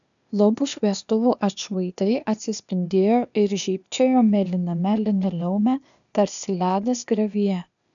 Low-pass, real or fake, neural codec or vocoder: 7.2 kHz; fake; codec, 16 kHz, 0.8 kbps, ZipCodec